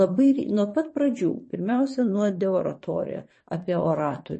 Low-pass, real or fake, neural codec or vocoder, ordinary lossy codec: 9.9 kHz; fake; vocoder, 22.05 kHz, 80 mel bands, WaveNeXt; MP3, 32 kbps